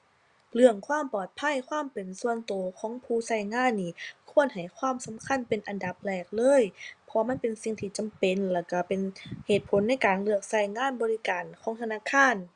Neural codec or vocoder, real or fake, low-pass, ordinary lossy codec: none; real; 9.9 kHz; Opus, 64 kbps